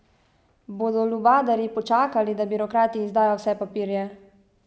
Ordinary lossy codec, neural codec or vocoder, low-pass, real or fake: none; none; none; real